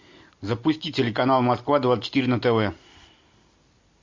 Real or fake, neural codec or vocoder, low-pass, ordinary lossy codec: real; none; 7.2 kHz; MP3, 48 kbps